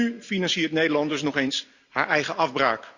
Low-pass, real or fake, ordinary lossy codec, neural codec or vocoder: 7.2 kHz; real; Opus, 64 kbps; none